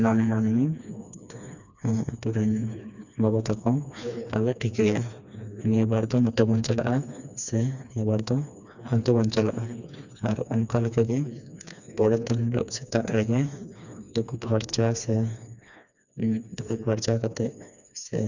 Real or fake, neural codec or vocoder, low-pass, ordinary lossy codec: fake; codec, 16 kHz, 2 kbps, FreqCodec, smaller model; 7.2 kHz; none